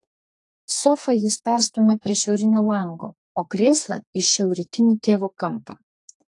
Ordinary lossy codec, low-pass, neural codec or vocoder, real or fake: AAC, 48 kbps; 10.8 kHz; codec, 32 kHz, 1.9 kbps, SNAC; fake